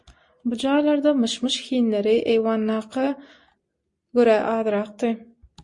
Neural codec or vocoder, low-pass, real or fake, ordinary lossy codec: none; 10.8 kHz; real; MP3, 48 kbps